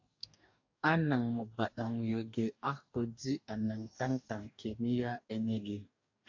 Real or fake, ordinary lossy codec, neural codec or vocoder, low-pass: fake; AAC, 48 kbps; codec, 44.1 kHz, 2.6 kbps, DAC; 7.2 kHz